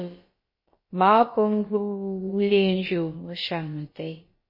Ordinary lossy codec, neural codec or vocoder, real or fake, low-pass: MP3, 24 kbps; codec, 16 kHz, about 1 kbps, DyCAST, with the encoder's durations; fake; 5.4 kHz